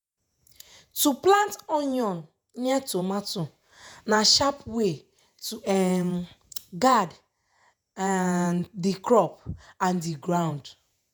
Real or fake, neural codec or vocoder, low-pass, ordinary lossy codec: fake; vocoder, 48 kHz, 128 mel bands, Vocos; none; none